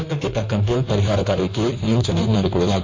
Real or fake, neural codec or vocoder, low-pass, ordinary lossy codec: fake; codec, 16 kHz in and 24 kHz out, 1.1 kbps, FireRedTTS-2 codec; 7.2 kHz; MP3, 48 kbps